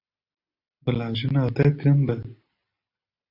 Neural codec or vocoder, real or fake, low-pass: none; real; 5.4 kHz